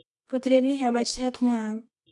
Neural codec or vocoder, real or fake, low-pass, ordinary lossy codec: codec, 24 kHz, 0.9 kbps, WavTokenizer, medium music audio release; fake; 10.8 kHz; none